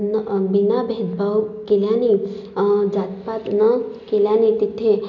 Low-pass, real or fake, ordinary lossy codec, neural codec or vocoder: 7.2 kHz; real; none; none